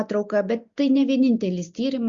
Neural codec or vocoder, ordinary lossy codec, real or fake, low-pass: none; Opus, 64 kbps; real; 7.2 kHz